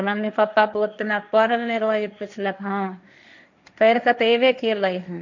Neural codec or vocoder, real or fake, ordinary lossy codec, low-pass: codec, 16 kHz, 1.1 kbps, Voila-Tokenizer; fake; none; 7.2 kHz